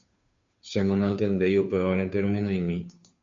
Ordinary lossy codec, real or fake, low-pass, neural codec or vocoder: MP3, 48 kbps; fake; 7.2 kHz; codec, 16 kHz, 2 kbps, FunCodec, trained on Chinese and English, 25 frames a second